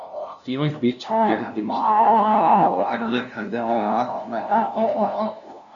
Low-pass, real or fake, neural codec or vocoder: 7.2 kHz; fake; codec, 16 kHz, 0.5 kbps, FunCodec, trained on LibriTTS, 25 frames a second